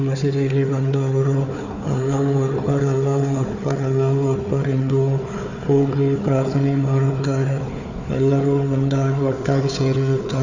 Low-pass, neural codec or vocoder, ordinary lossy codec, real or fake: 7.2 kHz; codec, 16 kHz, 4 kbps, FunCodec, trained on Chinese and English, 50 frames a second; none; fake